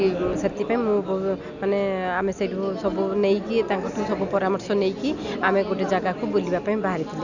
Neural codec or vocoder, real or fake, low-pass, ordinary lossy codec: none; real; 7.2 kHz; none